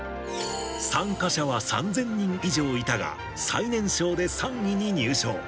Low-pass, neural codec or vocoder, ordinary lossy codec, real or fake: none; none; none; real